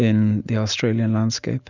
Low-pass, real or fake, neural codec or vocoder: 7.2 kHz; real; none